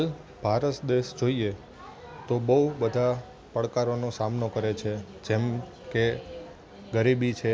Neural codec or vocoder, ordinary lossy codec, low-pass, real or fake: none; none; none; real